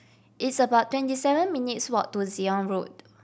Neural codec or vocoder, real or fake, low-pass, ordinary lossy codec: none; real; none; none